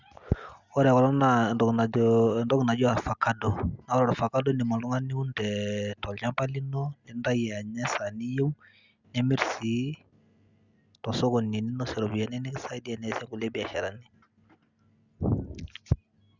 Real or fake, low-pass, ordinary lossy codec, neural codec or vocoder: real; 7.2 kHz; Opus, 64 kbps; none